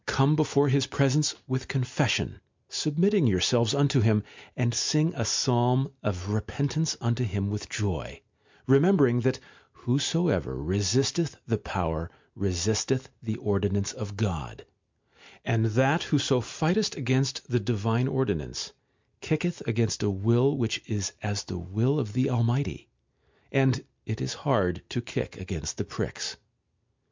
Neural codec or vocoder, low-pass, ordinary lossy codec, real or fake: none; 7.2 kHz; MP3, 64 kbps; real